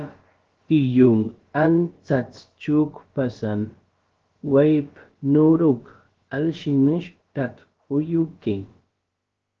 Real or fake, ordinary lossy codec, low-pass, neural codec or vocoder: fake; Opus, 16 kbps; 7.2 kHz; codec, 16 kHz, about 1 kbps, DyCAST, with the encoder's durations